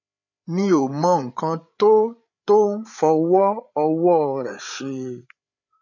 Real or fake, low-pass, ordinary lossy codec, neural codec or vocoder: fake; 7.2 kHz; none; codec, 16 kHz, 8 kbps, FreqCodec, larger model